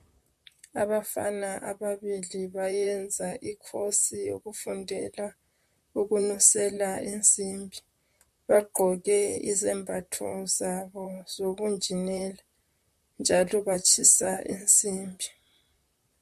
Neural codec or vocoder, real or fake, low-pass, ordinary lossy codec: vocoder, 44.1 kHz, 128 mel bands, Pupu-Vocoder; fake; 14.4 kHz; MP3, 64 kbps